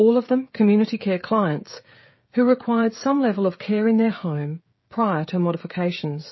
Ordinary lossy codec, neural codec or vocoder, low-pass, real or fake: MP3, 24 kbps; codec, 16 kHz, 16 kbps, FreqCodec, smaller model; 7.2 kHz; fake